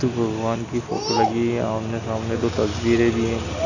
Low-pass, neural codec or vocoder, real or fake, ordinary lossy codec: 7.2 kHz; none; real; none